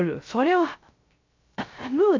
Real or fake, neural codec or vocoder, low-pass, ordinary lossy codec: fake; codec, 16 kHz, 0.3 kbps, FocalCodec; 7.2 kHz; MP3, 64 kbps